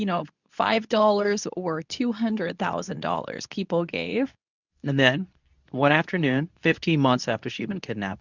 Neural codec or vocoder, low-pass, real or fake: codec, 24 kHz, 0.9 kbps, WavTokenizer, medium speech release version 2; 7.2 kHz; fake